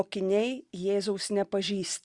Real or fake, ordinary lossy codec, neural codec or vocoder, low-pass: real; Opus, 64 kbps; none; 10.8 kHz